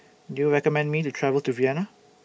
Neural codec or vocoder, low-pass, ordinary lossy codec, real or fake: none; none; none; real